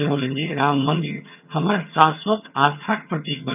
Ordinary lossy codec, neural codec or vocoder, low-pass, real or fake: none; vocoder, 22.05 kHz, 80 mel bands, HiFi-GAN; 3.6 kHz; fake